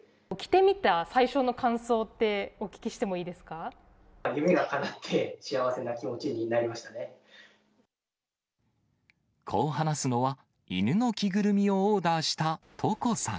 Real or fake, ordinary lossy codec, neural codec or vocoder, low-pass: real; none; none; none